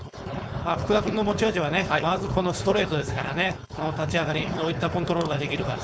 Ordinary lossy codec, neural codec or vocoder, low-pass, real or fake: none; codec, 16 kHz, 4.8 kbps, FACodec; none; fake